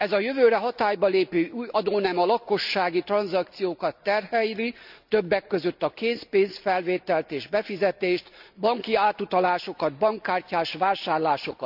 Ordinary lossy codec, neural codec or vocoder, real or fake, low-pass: none; none; real; 5.4 kHz